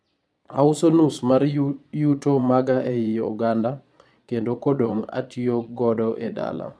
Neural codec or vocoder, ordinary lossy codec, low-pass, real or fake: vocoder, 22.05 kHz, 80 mel bands, Vocos; none; none; fake